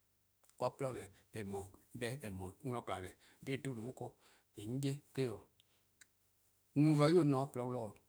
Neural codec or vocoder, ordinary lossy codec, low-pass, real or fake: autoencoder, 48 kHz, 32 numbers a frame, DAC-VAE, trained on Japanese speech; none; none; fake